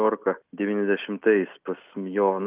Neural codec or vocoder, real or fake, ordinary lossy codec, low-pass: none; real; Opus, 24 kbps; 3.6 kHz